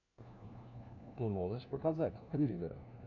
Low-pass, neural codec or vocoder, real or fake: 7.2 kHz; codec, 16 kHz, 1 kbps, FunCodec, trained on LibriTTS, 50 frames a second; fake